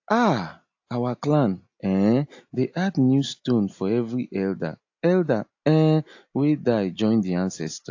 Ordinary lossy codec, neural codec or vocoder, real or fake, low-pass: AAC, 48 kbps; none; real; 7.2 kHz